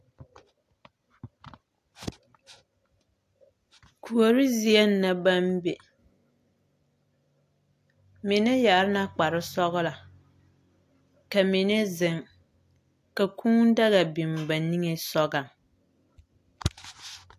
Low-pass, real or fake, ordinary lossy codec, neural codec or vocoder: 14.4 kHz; real; AAC, 64 kbps; none